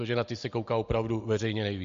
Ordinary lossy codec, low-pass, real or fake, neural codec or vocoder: MP3, 48 kbps; 7.2 kHz; real; none